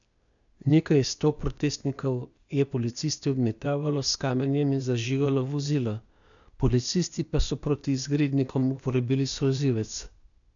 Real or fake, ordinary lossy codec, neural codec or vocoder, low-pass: fake; none; codec, 16 kHz, 0.8 kbps, ZipCodec; 7.2 kHz